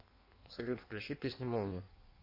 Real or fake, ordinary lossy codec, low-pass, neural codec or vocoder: fake; MP3, 32 kbps; 5.4 kHz; codec, 16 kHz in and 24 kHz out, 1.1 kbps, FireRedTTS-2 codec